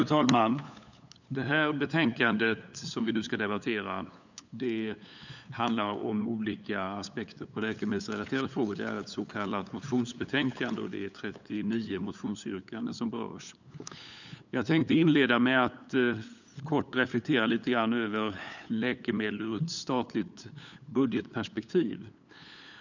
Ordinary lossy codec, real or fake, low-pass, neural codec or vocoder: none; fake; 7.2 kHz; codec, 16 kHz, 8 kbps, FunCodec, trained on LibriTTS, 25 frames a second